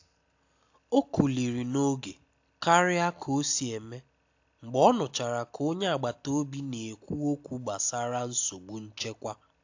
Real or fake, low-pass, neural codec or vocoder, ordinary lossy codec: real; 7.2 kHz; none; AAC, 48 kbps